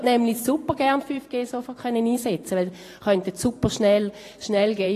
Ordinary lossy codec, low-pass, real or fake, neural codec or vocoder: AAC, 48 kbps; 14.4 kHz; fake; vocoder, 44.1 kHz, 128 mel bands every 512 samples, BigVGAN v2